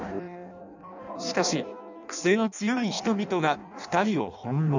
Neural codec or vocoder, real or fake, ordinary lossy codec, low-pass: codec, 16 kHz in and 24 kHz out, 0.6 kbps, FireRedTTS-2 codec; fake; none; 7.2 kHz